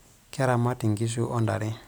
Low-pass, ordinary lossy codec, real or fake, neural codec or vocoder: none; none; real; none